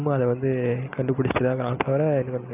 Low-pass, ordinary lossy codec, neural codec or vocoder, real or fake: 3.6 kHz; AAC, 32 kbps; none; real